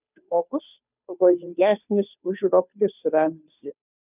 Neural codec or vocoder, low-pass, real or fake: codec, 16 kHz, 2 kbps, FunCodec, trained on Chinese and English, 25 frames a second; 3.6 kHz; fake